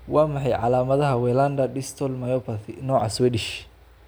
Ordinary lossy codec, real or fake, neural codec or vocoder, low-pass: none; real; none; none